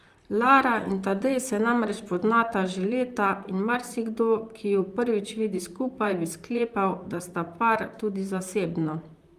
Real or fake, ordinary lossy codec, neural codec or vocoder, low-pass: fake; Opus, 32 kbps; vocoder, 44.1 kHz, 128 mel bands, Pupu-Vocoder; 14.4 kHz